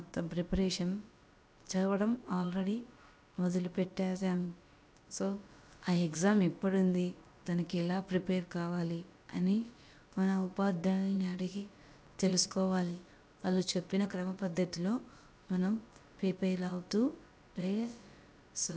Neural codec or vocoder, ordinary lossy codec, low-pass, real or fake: codec, 16 kHz, about 1 kbps, DyCAST, with the encoder's durations; none; none; fake